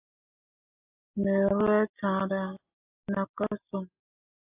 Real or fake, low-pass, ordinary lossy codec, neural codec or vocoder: real; 3.6 kHz; AAC, 24 kbps; none